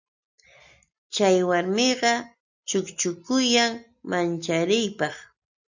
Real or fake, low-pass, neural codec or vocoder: real; 7.2 kHz; none